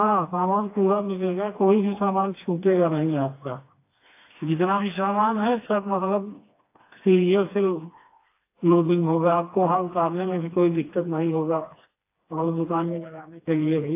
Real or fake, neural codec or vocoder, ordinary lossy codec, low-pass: fake; codec, 16 kHz, 2 kbps, FreqCodec, smaller model; AAC, 24 kbps; 3.6 kHz